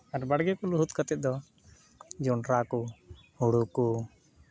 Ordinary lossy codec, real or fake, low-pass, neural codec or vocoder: none; real; none; none